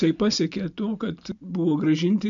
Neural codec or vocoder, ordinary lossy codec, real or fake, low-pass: none; MP3, 64 kbps; real; 7.2 kHz